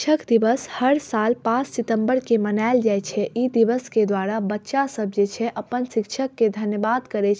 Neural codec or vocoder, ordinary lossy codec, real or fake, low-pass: none; none; real; none